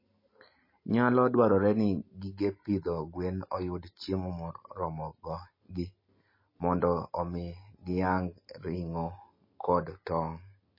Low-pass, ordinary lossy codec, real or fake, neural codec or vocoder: 5.4 kHz; MP3, 24 kbps; real; none